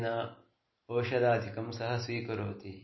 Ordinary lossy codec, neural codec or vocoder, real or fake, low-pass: MP3, 24 kbps; none; real; 7.2 kHz